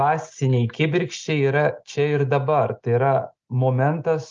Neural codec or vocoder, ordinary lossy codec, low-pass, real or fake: none; Opus, 32 kbps; 7.2 kHz; real